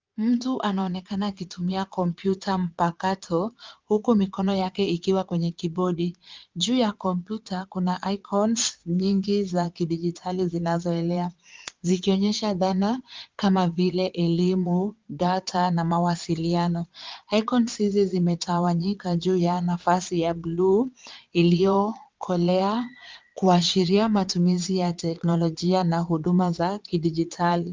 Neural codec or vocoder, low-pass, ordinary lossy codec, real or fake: vocoder, 22.05 kHz, 80 mel bands, Vocos; 7.2 kHz; Opus, 24 kbps; fake